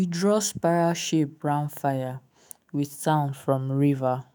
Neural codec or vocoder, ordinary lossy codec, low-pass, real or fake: autoencoder, 48 kHz, 128 numbers a frame, DAC-VAE, trained on Japanese speech; none; none; fake